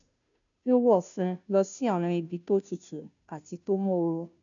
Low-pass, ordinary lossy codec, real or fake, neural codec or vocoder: 7.2 kHz; none; fake; codec, 16 kHz, 0.5 kbps, FunCodec, trained on Chinese and English, 25 frames a second